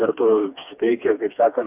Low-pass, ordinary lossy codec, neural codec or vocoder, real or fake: 3.6 kHz; AAC, 32 kbps; codec, 16 kHz, 2 kbps, FreqCodec, smaller model; fake